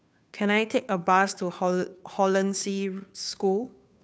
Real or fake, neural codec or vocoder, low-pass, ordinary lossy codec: fake; codec, 16 kHz, 2 kbps, FunCodec, trained on Chinese and English, 25 frames a second; none; none